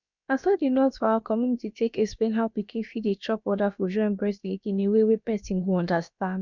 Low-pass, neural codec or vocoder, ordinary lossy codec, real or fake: 7.2 kHz; codec, 16 kHz, about 1 kbps, DyCAST, with the encoder's durations; none; fake